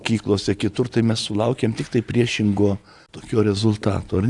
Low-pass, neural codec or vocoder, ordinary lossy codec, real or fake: 10.8 kHz; none; AAC, 64 kbps; real